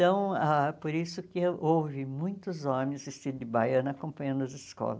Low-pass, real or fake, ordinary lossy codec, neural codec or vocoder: none; real; none; none